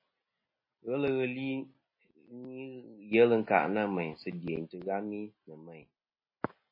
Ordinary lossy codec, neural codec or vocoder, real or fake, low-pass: MP3, 24 kbps; none; real; 5.4 kHz